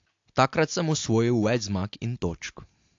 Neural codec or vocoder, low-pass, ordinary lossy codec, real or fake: none; 7.2 kHz; AAC, 48 kbps; real